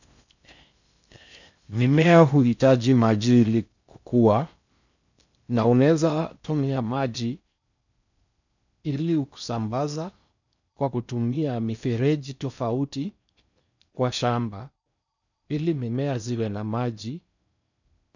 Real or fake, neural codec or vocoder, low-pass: fake; codec, 16 kHz in and 24 kHz out, 0.6 kbps, FocalCodec, streaming, 4096 codes; 7.2 kHz